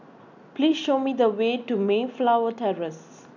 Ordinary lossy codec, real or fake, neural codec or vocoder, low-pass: none; real; none; 7.2 kHz